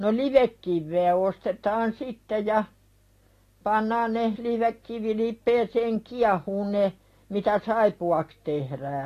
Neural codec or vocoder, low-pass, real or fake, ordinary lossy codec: none; 14.4 kHz; real; AAC, 48 kbps